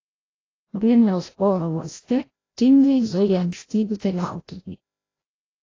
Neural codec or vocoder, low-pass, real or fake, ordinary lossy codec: codec, 16 kHz, 0.5 kbps, FreqCodec, larger model; 7.2 kHz; fake; AAC, 32 kbps